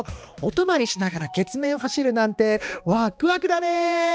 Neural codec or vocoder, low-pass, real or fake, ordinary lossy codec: codec, 16 kHz, 2 kbps, X-Codec, HuBERT features, trained on balanced general audio; none; fake; none